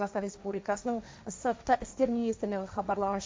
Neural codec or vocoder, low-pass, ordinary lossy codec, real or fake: codec, 16 kHz, 1.1 kbps, Voila-Tokenizer; none; none; fake